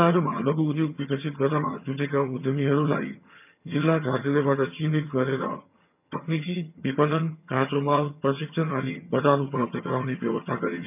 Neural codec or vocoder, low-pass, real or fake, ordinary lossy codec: vocoder, 22.05 kHz, 80 mel bands, HiFi-GAN; 3.6 kHz; fake; none